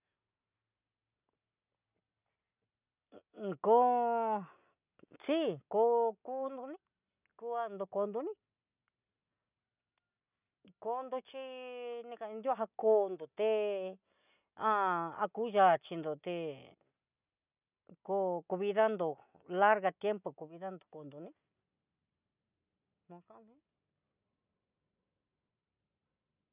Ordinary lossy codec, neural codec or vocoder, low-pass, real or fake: none; none; 3.6 kHz; real